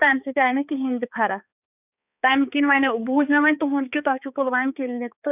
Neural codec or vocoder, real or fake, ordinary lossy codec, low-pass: codec, 16 kHz, 4 kbps, X-Codec, HuBERT features, trained on balanced general audio; fake; none; 3.6 kHz